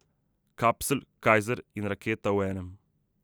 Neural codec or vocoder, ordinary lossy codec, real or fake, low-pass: none; none; real; none